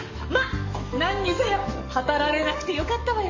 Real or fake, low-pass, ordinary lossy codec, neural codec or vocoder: fake; 7.2 kHz; MP3, 32 kbps; codec, 44.1 kHz, 7.8 kbps, DAC